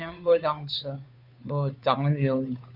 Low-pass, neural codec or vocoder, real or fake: 5.4 kHz; codec, 16 kHz, 2 kbps, FunCodec, trained on Chinese and English, 25 frames a second; fake